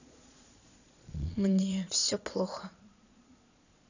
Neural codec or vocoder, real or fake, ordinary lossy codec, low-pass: vocoder, 22.05 kHz, 80 mel bands, Vocos; fake; none; 7.2 kHz